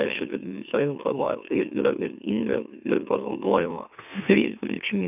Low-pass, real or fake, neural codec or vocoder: 3.6 kHz; fake; autoencoder, 44.1 kHz, a latent of 192 numbers a frame, MeloTTS